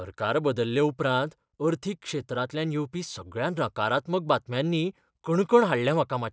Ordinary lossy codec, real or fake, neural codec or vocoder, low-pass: none; real; none; none